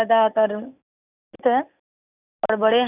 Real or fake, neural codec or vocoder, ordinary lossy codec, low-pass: real; none; none; 3.6 kHz